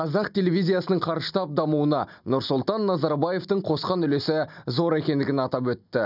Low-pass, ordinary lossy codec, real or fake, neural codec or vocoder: 5.4 kHz; none; real; none